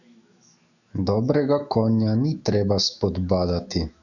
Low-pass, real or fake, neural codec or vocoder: 7.2 kHz; fake; autoencoder, 48 kHz, 128 numbers a frame, DAC-VAE, trained on Japanese speech